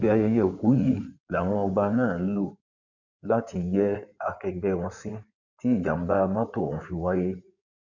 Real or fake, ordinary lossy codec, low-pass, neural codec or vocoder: fake; none; 7.2 kHz; codec, 16 kHz in and 24 kHz out, 2.2 kbps, FireRedTTS-2 codec